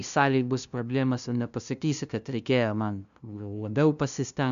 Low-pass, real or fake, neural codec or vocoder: 7.2 kHz; fake; codec, 16 kHz, 0.5 kbps, FunCodec, trained on LibriTTS, 25 frames a second